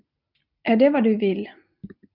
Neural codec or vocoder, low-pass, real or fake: none; 5.4 kHz; real